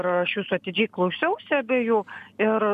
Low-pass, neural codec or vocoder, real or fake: 14.4 kHz; none; real